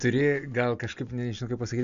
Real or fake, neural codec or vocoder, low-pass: real; none; 7.2 kHz